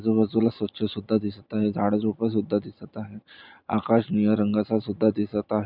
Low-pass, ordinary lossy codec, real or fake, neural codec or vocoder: 5.4 kHz; none; real; none